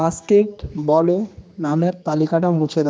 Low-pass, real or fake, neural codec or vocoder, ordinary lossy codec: none; fake; codec, 16 kHz, 2 kbps, X-Codec, HuBERT features, trained on general audio; none